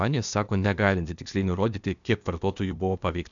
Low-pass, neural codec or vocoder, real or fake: 7.2 kHz; codec, 16 kHz, 0.8 kbps, ZipCodec; fake